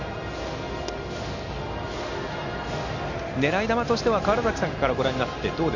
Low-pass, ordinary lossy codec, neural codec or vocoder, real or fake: 7.2 kHz; none; none; real